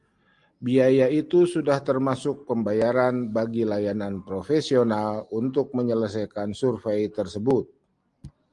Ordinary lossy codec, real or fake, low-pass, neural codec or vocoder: Opus, 32 kbps; real; 10.8 kHz; none